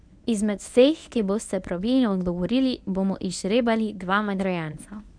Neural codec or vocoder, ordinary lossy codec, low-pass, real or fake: codec, 24 kHz, 0.9 kbps, WavTokenizer, medium speech release version 1; none; 9.9 kHz; fake